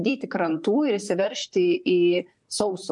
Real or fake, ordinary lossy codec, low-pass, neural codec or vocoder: fake; MP3, 64 kbps; 10.8 kHz; vocoder, 44.1 kHz, 128 mel bands, Pupu-Vocoder